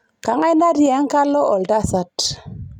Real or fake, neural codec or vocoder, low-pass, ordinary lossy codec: real; none; 19.8 kHz; none